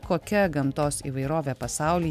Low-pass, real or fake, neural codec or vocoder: 14.4 kHz; real; none